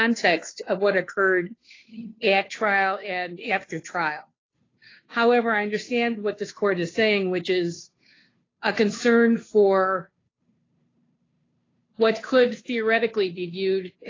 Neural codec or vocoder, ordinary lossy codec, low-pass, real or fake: codec, 16 kHz, 2 kbps, FunCodec, trained on Chinese and English, 25 frames a second; AAC, 32 kbps; 7.2 kHz; fake